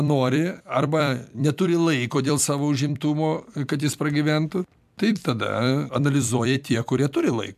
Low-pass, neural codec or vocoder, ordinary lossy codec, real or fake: 14.4 kHz; vocoder, 44.1 kHz, 128 mel bands every 256 samples, BigVGAN v2; MP3, 96 kbps; fake